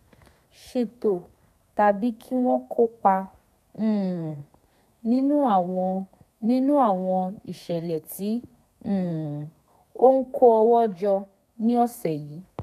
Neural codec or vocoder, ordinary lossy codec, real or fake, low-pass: codec, 32 kHz, 1.9 kbps, SNAC; none; fake; 14.4 kHz